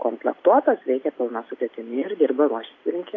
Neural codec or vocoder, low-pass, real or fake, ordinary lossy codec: none; 7.2 kHz; real; AAC, 32 kbps